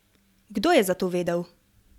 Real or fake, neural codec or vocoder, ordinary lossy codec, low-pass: real; none; none; 19.8 kHz